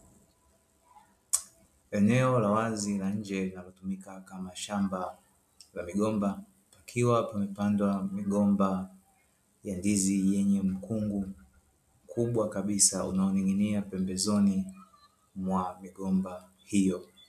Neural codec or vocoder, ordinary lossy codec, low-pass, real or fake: none; MP3, 96 kbps; 14.4 kHz; real